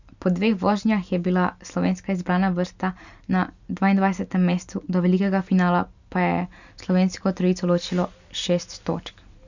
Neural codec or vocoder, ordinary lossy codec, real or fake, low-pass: none; none; real; 7.2 kHz